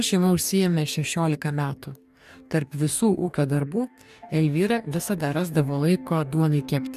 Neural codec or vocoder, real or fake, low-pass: codec, 44.1 kHz, 2.6 kbps, DAC; fake; 14.4 kHz